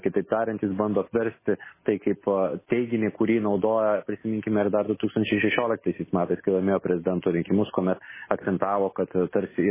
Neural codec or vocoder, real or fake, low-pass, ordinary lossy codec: none; real; 3.6 kHz; MP3, 16 kbps